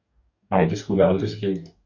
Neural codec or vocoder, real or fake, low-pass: codec, 44.1 kHz, 2.6 kbps, DAC; fake; 7.2 kHz